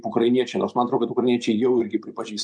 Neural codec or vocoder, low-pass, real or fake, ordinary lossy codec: none; 9.9 kHz; real; MP3, 96 kbps